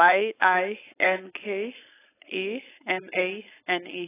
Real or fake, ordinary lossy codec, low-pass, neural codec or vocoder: fake; AAC, 16 kbps; 3.6 kHz; codec, 16 kHz, 4.8 kbps, FACodec